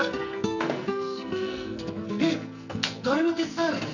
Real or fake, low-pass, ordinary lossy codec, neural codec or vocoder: fake; 7.2 kHz; none; codec, 44.1 kHz, 2.6 kbps, SNAC